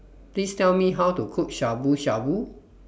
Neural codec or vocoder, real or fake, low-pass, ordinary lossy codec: none; real; none; none